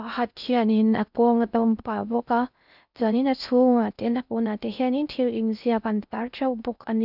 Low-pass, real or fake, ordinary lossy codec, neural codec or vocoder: 5.4 kHz; fake; none; codec, 16 kHz in and 24 kHz out, 0.6 kbps, FocalCodec, streaming, 2048 codes